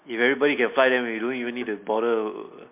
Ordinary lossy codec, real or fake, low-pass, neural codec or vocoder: MP3, 32 kbps; real; 3.6 kHz; none